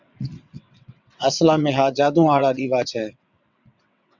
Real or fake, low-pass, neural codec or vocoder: fake; 7.2 kHz; vocoder, 22.05 kHz, 80 mel bands, WaveNeXt